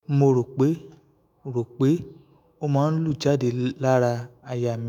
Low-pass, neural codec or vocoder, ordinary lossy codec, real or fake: 19.8 kHz; autoencoder, 48 kHz, 128 numbers a frame, DAC-VAE, trained on Japanese speech; none; fake